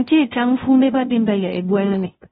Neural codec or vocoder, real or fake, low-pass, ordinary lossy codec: codec, 16 kHz, 0.5 kbps, FunCodec, trained on Chinese and English, 25 frames a second; fake; 7.2 kHz; AAC, 16 kbps